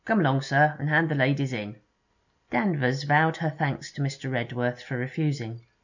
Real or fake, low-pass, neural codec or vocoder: real; 7.2 kHz; none